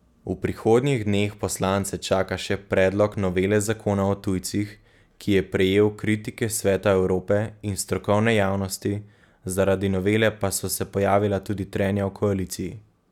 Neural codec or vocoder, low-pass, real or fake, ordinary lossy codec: none; 19.8 kHz; real; none